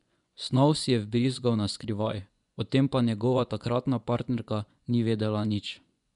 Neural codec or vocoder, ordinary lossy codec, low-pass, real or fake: vocoder, 24 kHz, 100 mel bands, Vocos; none; 10.8 kHz; fake